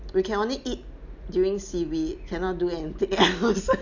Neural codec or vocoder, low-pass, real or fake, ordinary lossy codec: none; 7.2 kHz; real; none